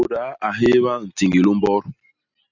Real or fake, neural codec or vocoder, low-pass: real; none; 7.2 kHz